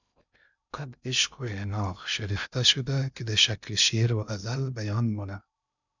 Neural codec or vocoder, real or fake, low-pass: codec, 16 kHz in and 24 kHz out, 0.6 kbps, FocalCodec, streaming, 2048 codes; fake; 7.2 kHz